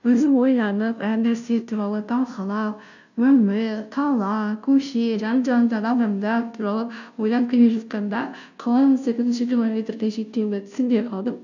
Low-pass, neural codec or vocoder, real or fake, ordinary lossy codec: 7.2 kHz; codec, 16 kHz, 0.5 kbps, FunCodec, trained on Chinese and English, 25 frames a second; fake; none